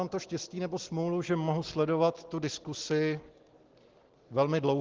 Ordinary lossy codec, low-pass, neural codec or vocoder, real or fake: Opus, 16 kbps; 7.2 kHz; none; real